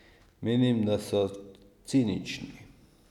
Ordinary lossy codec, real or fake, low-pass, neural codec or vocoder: none; real; 19.8 kHz; none